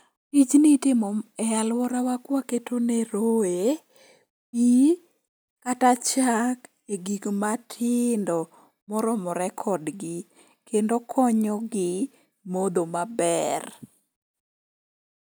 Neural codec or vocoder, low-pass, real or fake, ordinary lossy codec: none; none; real; none